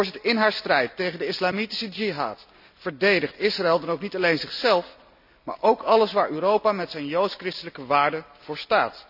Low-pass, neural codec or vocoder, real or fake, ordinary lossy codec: 5.4 kHz; none; real; none